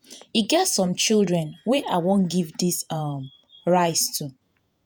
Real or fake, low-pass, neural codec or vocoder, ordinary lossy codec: fake; none; vocoder, 48 kHz, 128 mel bands, Vocos; none